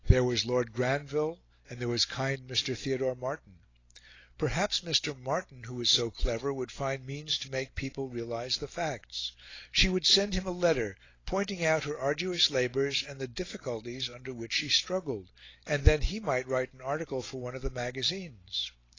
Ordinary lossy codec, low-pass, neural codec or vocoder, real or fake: AAC, 32 kbps; 7.2 kHz; none; real